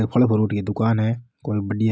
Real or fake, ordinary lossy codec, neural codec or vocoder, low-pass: real; none; none; none